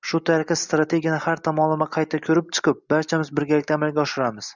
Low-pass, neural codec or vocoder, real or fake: 7.2 kHz; none; real